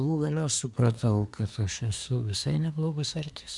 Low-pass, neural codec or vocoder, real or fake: 10.8 kHz; codec, 24 kHz, 1 kbps, SNAC; fake